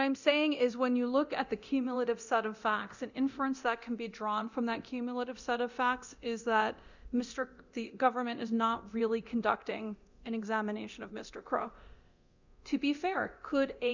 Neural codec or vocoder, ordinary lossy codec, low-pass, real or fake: codec, 24 kHz, 0.9 kbps, DualCodec; Opus, 64 kbps; 7.2 kHz; fake